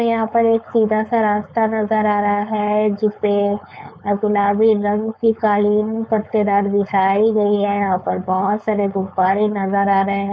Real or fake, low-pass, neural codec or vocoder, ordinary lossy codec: fake; none; codec, 16 kHz, 4.8 kbps, FACodec; none